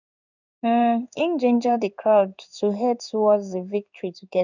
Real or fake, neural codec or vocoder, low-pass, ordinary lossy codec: fake; codec, 16 kHz in and 24 kHz out, 1 kbps, XY-Tokenizer; 7.2 kHz; none